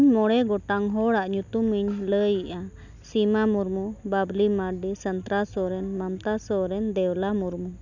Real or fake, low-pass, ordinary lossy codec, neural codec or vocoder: real; 7.2 kHz; none; none